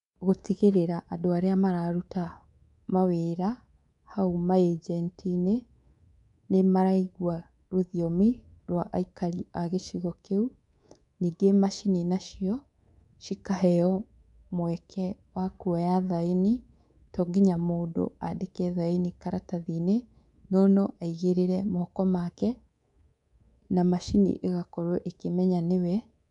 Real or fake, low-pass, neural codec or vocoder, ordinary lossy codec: fake; 10.8 kHz; codec, 24 kHz, 3.1 kbps, DualCodec; none